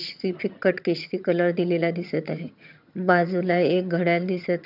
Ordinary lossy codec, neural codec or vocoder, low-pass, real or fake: none; vocoder, 22.05 kHz, 80 mel bands, HiFi-GAN; 5.4 kHz; fake